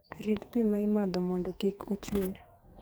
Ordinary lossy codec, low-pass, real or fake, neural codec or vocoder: none; none; fake; codec, 44.1 kHz, 2.6 kbps, SNAC